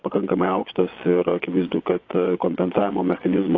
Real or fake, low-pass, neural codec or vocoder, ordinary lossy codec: fake; 7.2 kHz; vocoder, 22.05 kHz, 80 mel bands, Vocos; AAC, 32 kbps